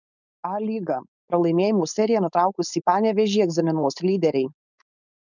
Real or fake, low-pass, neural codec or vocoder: fake; 7.2 kHz; codec, 16 kHz, 4.8 kbps, FACodec